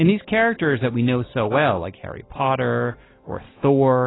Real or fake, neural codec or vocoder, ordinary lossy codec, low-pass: real; none; AAC, 16 kbps; 7.2 kHz